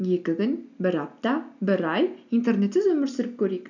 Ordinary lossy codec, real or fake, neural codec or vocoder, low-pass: none; real; none; 7.2 kHz